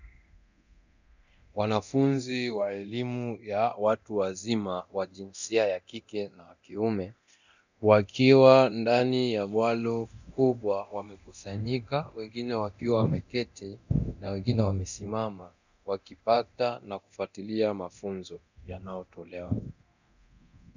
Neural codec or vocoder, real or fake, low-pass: codec, 24 kHz, 0.9 kbps, DualCodec; fake; 7.2 kHz